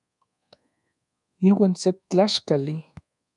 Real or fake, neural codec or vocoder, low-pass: fake; codec, 24 kHz, 1.2 kbps, DualCodec; 10.8 kHz